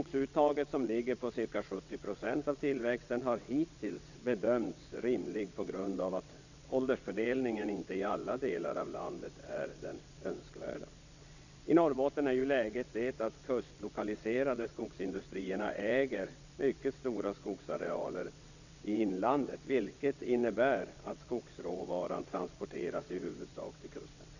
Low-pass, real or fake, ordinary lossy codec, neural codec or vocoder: 7.2 kHz; fake; none; vocoder, 22.05 kHz, 80 mel bands, WaveNeXt